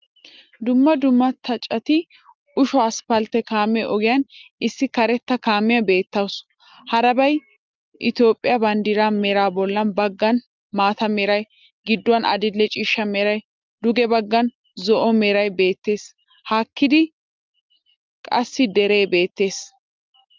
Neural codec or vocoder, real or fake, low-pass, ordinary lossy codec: none; real; 7.2 kHz; Opus, 24 kbps